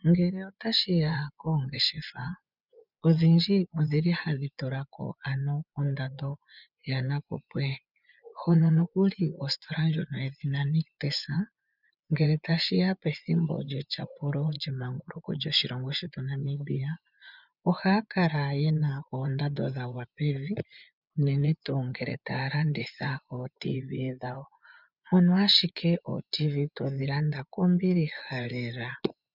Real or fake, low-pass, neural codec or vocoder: fake; 5.4 kHz; vocoder, 24 kHz, 100 mel bands, Vocos